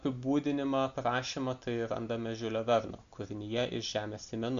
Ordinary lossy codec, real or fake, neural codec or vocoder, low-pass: MP3, 64 kbps; real; none; 7.2 kHz